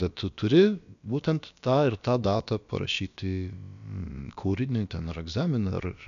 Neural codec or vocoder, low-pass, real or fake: codec, 16 kHz, about 1 kbps, DyCAST, with the encoder's durations; 7.2 kHz; fake